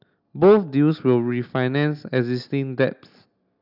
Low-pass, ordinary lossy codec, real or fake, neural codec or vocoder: 5.4 kHz; none; real; none